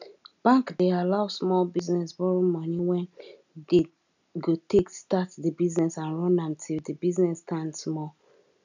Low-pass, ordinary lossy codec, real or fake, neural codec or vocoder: 7.2 kHz; none; real; none